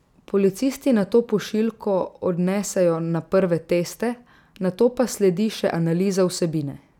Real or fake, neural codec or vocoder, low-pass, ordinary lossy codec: real; none; 19.8 kHz; none